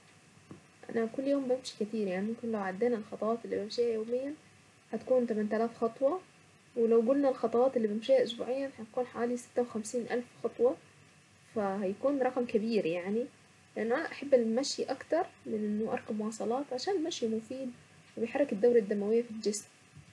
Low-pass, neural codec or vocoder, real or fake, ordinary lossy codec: none; none; real; none